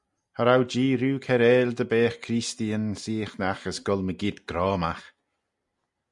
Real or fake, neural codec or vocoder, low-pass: real; none; 10.8 kHz